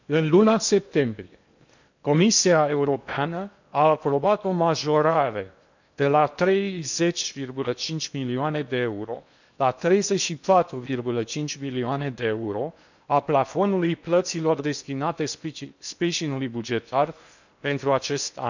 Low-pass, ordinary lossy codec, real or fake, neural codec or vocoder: 7.2 kHz; none; fake; codec, 16 kHz in and 24 kHz out, 0.8 kbps, FocalCodec, streaming, 65536 codes